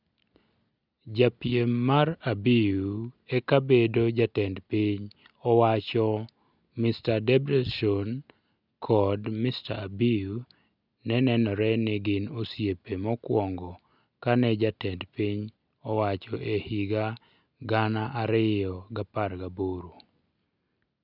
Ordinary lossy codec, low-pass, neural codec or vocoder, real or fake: none; 5.4 kHz; none; real